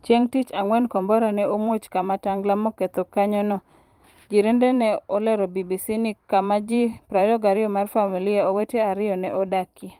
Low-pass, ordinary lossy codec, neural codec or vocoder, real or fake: 19.8 kHz; Opus, 32 kbps; vocoder, 44.1 kHz, 128 mel bands every 512 samples, BigVGAN v2; fake